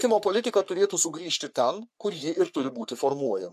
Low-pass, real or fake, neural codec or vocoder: 14.4 kHz; fake; codec, 44.1 kHz, 3.4 kbps, Pupu-Codec